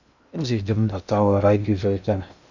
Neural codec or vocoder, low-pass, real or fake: codec, 16 kHz in and 24 kHz out, 0.6 kbps, FocalCodec, streaming, 2048 codes; 7.2 kHz; fake